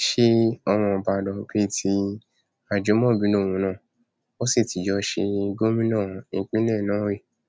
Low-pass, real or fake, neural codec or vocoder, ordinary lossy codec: none; real; none; none